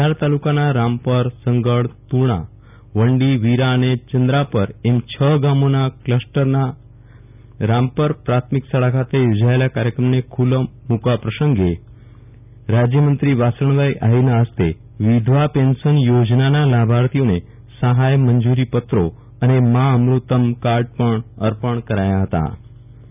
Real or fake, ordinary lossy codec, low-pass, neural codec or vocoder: real; none; 3.6 kHz; none